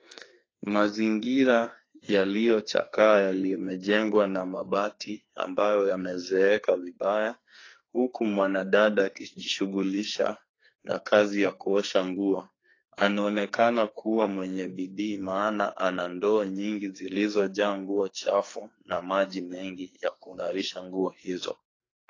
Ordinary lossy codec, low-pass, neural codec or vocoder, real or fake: AAC, 32 kbps; 7.2 kHz; codec, 44.1 kHz, 2.6 kbps, SNAC; fake